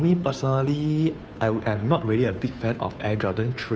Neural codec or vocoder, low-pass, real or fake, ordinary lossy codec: codec, 16 kHz, 2 kbps, FunCodec, trained on Chinese and English, 25 frames a second; none; fake; none